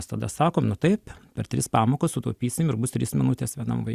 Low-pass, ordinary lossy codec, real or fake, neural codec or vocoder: 14.4 kHz; Opus, 64 kbps; real; none